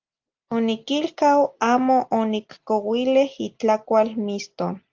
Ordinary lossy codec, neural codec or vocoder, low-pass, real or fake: Opus, 24 kbps; none; 7.2 kHz; real